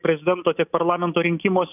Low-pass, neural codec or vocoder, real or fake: 3.6 kHz; none; real